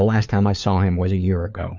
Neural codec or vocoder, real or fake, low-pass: codec, 16 kHz, 4 kbps, FunCodec, trained on LibriTTS, 50 frames a second; fake; 7.2 kHz